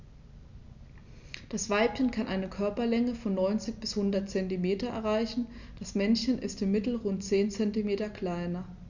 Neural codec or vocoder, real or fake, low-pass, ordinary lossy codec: none; real; 7.2 kHz; none